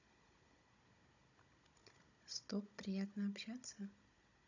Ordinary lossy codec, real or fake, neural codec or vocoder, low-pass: none; fake; codec, 16 kHz, 16 kbps, FunCodec, trained on Chinese and English, 50 frames a second; 7.2 kHz